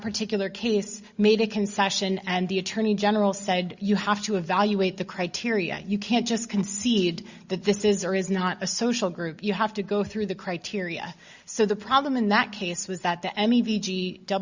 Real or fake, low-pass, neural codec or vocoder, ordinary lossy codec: real; 7.2 kHz; none; Opus, 64 kbps